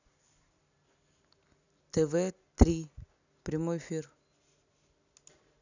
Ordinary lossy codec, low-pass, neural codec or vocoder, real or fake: none; 7.2 kHz; none; real